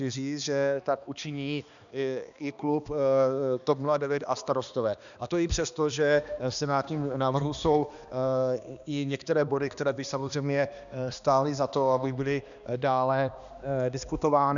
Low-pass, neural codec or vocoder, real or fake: 7.2 kHz; codec, 16 kHz, 2 kbps, X-Codec, HuBERT features, trained on balanced general audio; fake